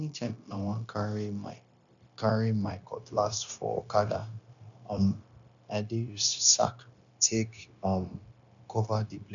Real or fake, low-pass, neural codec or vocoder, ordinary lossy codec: fake; 7.2 kHz; codec, 16 kHz, 0.9 kbps, LongCat-Audio-Codec; none